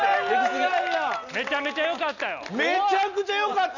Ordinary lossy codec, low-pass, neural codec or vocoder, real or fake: none; 7.2 kHz; none; real